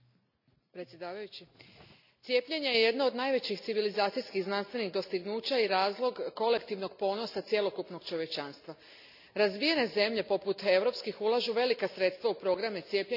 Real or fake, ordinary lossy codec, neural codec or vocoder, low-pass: real; none; none; 5.4 kHz